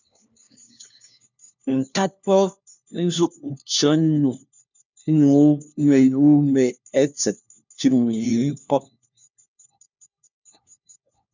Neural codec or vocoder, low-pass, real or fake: codec, 16 kHz, 1 kbps, FunCodec, trained on LibriTTS, 50 frames a second; 7.2 kHz; fake